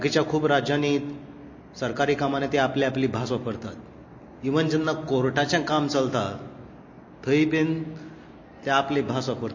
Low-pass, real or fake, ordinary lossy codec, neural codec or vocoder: 7.2 kHz; real; MP3, 32 kbps; none